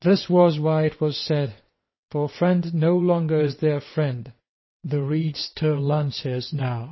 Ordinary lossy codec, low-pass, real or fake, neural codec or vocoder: MP3, 24 kbps; 7.2 kHz; fake; vocoder, 22.05 kHz, 80 mel bands, WaveNeXt